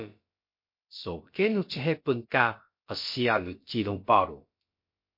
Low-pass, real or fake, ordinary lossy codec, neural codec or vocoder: 5.4 kHz; fake; MP3, 32 kbps; codec, 16 kHz, about 1 kbps, DyCAST, with the encoder's durations